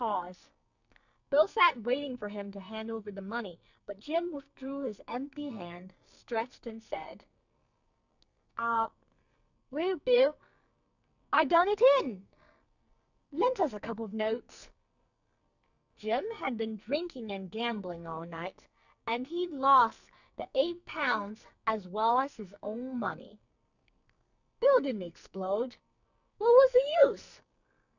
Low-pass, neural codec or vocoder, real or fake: 7.2 kHz; codec, 44.1 kHz, 2.6 kbps, SNAC; fake